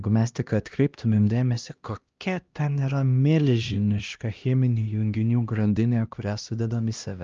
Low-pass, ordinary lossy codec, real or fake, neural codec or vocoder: 7.2 kHz; Opus, 24 kbps; fake; codec, 16 kHz, 1 kbps, X-Codec, HuBERT features, trained on LibriSpeech